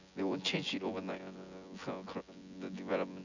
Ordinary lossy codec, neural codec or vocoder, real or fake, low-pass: none; vocoder, 24 kHz, 100 mel bands, Vocos; fake; 7.2 kHz